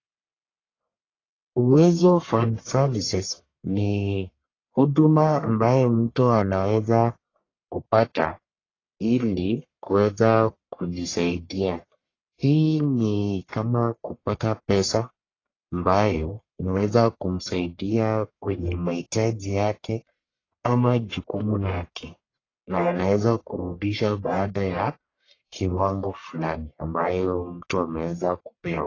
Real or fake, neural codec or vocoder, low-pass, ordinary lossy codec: fake; codec, 44.1 kHz, 1.7 kbps, Pupu-Codec; 7.2 kHz; AAC, 32 kbps